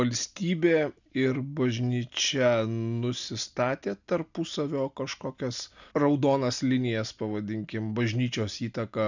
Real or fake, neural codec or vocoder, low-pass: real; none; 7.2 kHz